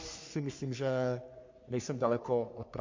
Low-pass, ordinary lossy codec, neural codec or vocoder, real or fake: 7.2 kHz; MP3, 48 kbps; codec, 44.1 kHz, 3.4 kbps, Pupu-Codec; fake